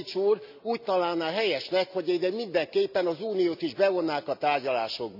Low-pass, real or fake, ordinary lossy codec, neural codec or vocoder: 5.4 kHz; real; none; none